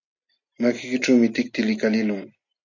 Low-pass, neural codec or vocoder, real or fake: 7.2 kHz; none; real